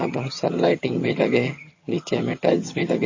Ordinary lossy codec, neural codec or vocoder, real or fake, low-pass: MP3, 32 kbps; vocoder, 22.05 kHz, 80 mel bands, HiFi-GAN; fake; 7.2 kHz